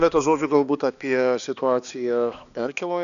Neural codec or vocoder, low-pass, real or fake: codec, 16 kHz, 2 kbps, X-Codec, HuBERT features, trained on balanced general audio; 7.2 kHz; fake